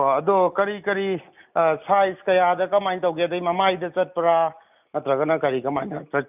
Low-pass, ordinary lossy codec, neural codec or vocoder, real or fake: 3.6 kHz; none; none; real